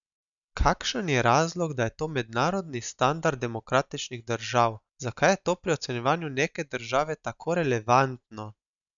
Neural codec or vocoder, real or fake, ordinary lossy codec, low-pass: none; real; none; 7.2 kHz